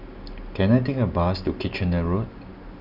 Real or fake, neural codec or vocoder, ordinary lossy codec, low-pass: real; none; none; 5.4 kHz